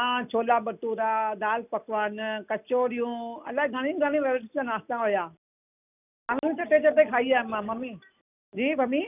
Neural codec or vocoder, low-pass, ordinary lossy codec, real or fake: none; 3.6 kHz; none; real